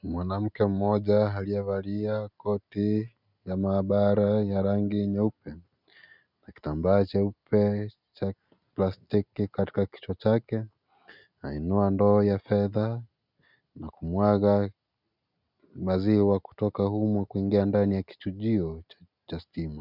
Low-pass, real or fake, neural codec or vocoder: 5.4 kHz; real; none